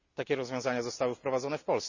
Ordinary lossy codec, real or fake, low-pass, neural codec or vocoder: none; real; 7.2 kHz; none